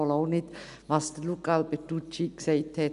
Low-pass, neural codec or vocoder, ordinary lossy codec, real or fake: 10.8 kHz; none; none; real